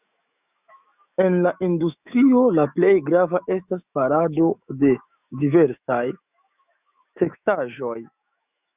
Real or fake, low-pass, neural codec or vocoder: fake; 3.6 kHz; autoencoder, 48 kHz, 128 numbers a frame, DAC-VAE, trained on Japanese speech